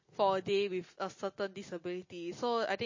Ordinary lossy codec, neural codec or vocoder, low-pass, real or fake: MP3, 32 kbps; none; 7.2 kHz; real